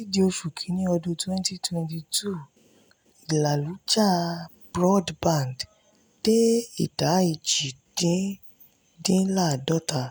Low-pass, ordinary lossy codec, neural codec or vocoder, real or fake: none; none; none; real